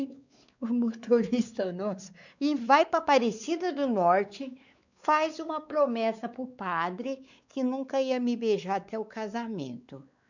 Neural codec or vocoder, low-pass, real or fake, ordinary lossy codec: codec, 16 kHz, 4 kbps, X-Codec, WavLM features, trained on Multilingual LibriSpeech; 7.2 kHz; fake; none